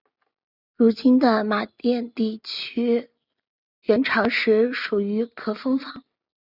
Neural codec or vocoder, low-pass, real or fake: none; 5.4 kHz; real